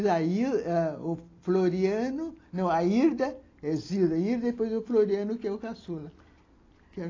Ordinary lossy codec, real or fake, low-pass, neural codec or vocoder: AAC, 32 kbps; real; 7.2 kHz; none